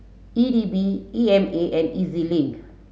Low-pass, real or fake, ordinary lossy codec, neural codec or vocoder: none; real; none; none